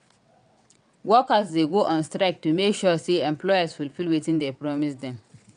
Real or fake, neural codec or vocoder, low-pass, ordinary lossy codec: fake; vocoder, 22.05 kHz, 80 mel bands, WaveNeXt; 9.9 kHz; none